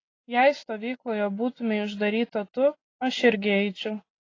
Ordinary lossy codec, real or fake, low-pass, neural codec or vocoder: AAC, 32 kbps; real; 7.2 kHz; none